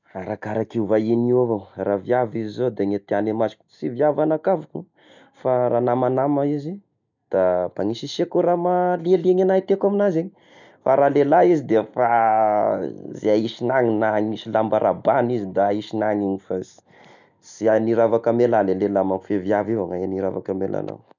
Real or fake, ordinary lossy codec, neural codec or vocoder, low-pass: real; none; none; 7.2 kHz